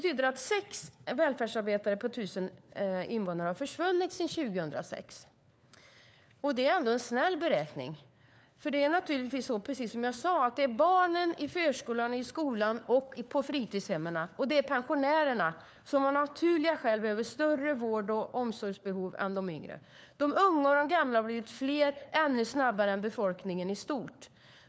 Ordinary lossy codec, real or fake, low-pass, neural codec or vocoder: none; fake; none; codec, 16 kHz, 4 kbps, FunCodec, trained on LibriTTS, 50 frames a second